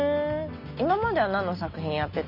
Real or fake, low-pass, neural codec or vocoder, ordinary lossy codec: real; 5.4 kHz; none; none